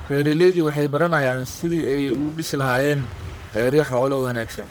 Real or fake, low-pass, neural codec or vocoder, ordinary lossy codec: fake; none; codec, 44.1 kHz, 1.7 kbps, Pupu-Codec; none